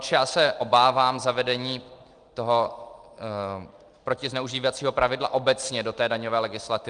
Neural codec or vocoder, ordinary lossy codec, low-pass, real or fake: none; Opus, 32 kbps; 9.9 kHz; real